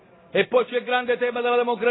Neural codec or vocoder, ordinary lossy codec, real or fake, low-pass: none; AAC, 16 kbps; real; 7.2 kHz